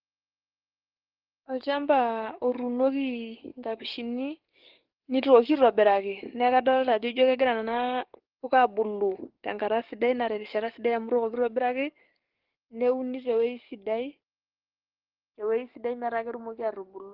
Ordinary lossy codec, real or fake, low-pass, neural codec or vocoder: Opus, 16 kbps; fake; 5.4 kHz; codec, 44.1 kHz, 7.8 kbps, DAC